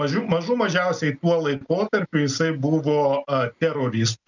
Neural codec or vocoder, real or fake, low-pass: none; real; 7.2 kHz